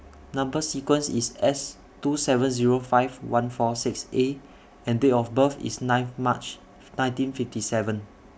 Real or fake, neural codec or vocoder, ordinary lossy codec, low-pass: real; none; none; none